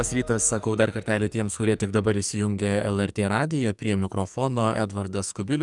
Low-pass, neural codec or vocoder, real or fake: 10.8 kHz; codec, 44.1 kHz, 2.6 kbps, SNAC; fake